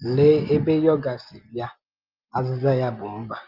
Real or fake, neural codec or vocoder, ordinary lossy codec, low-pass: real; none; Opus, 32 kbps; 5.4 kHz